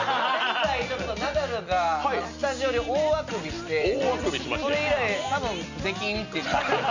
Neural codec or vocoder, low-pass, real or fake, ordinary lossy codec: none; 7.2 kHz; real; none